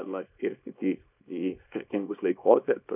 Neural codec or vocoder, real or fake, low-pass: codec, 24 kHz, 0.9 kbps, WavTokenizer, small release; fake; 3.6 kHz